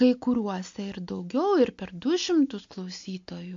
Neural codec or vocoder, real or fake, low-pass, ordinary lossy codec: none; real; 7.2 kHz; MP3, 48 kbps